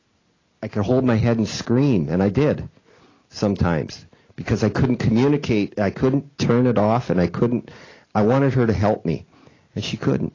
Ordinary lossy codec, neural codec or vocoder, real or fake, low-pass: AAC, 32 kbps; none; real; 7.2 kHz